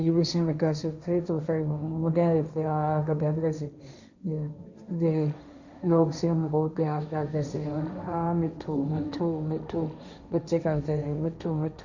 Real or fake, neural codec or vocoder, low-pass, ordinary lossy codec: fake; codec, 16 kHz, 1.1 kbps, Voila-Tokenizer; 7.2 kHz; none